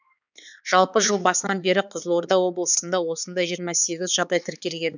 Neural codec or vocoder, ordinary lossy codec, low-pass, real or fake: codec, 16 kHz, 4 kbps, X-Codec, HuBERT features, trained on balanced general audio; none; 7.2 kHz; fake